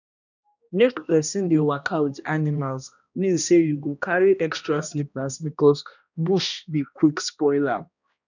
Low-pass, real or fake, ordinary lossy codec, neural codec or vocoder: 7.2 kHz; fake; none; codec, 16 kHz, 1 kbps, X-Codec, HuBERT features, trained on balanced general audio